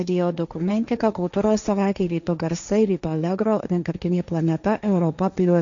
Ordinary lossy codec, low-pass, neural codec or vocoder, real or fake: MP3, 96 kbps; 7.2 kHz; codec, 16 kHz, 1.1 kbps, Voila-Tokenizer; fake